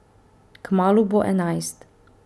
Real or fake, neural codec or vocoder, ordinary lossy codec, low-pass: real; none; none; none